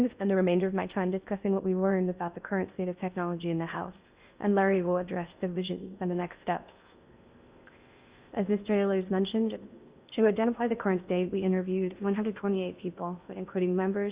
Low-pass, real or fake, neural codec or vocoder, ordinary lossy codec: 3.6 kHz; fake; codec, 16 kHz in and 24 kHz out, 0.6 kbps, FocalCodec, streaming, 2048 codes; Opus, 64 kbps